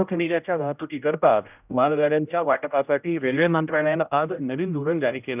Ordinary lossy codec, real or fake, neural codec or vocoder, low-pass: none; fake; codec, 16 kHz, 0.5 kbps, X-Codec, HuBERT features, trained on general audio; 3.6 kHz